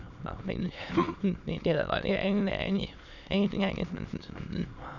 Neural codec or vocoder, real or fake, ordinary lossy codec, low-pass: autoencoder, 22.05 kHz, a latent of 192 numbers a frame, VITS, trained on many speakers; fake; none; 7.2 kHz